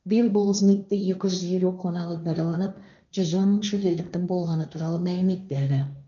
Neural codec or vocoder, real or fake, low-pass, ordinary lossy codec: codec, 16 kHz, 1.1 kbps, Voila-Tokenizer; fake; 7.2 kHz; none